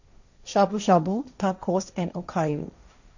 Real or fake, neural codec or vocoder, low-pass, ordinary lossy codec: fake; codec, 16 kHz, 1.1 kbps, Voila-Tokenizer; 7.2 kHz; none